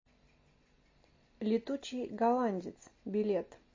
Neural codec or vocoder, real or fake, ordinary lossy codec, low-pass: none; real; MP3, 32 kbps; 7.2 kHz